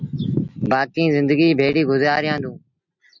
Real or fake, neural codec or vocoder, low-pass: real; none; 7.2 kHz